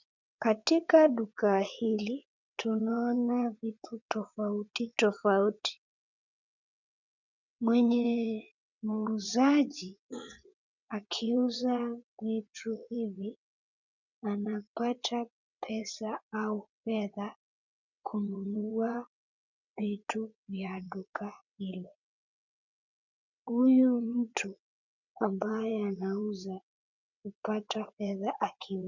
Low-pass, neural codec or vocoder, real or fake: 7.2 kHz; vocoder, 22.05 kHz, 80 mel bands, WaveNeXt; fake